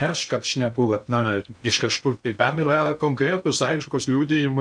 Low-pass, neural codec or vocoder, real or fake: 9.9 kHz; codec, 16 kHz in and 24 kHz out, 0.6 kbps, FocalCodec, streaming, 2048 codes; fake